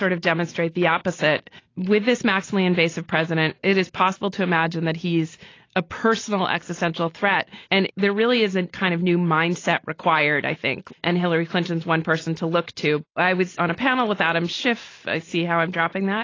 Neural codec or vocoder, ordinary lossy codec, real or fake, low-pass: none; AAC, 32 kbps; real; 7.2 kHz